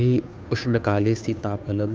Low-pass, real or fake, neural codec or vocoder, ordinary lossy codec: none; fake; codec, 16 kHz, 2 kbps, FunCodec, trained on Chinese and English, 25 frames a second; none